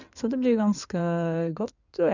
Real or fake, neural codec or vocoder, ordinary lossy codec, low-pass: fake; codec, 16 kHz in and 24 kHz out, 2.2 kbps, FireRedTTS-2 codec; none; 7.2 kHz